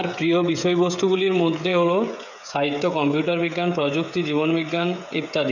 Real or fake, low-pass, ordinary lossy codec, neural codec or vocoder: fake; 7.2 kHz; none; codec, 16 kHz, 16 kbps, FreqCodec, smaller model